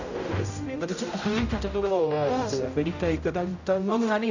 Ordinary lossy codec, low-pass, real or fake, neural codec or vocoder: none; 7.2 kHz; fake; codec, 16 kHz, 0.5 kbps, X-Codec, HuBERT features, trained on general audio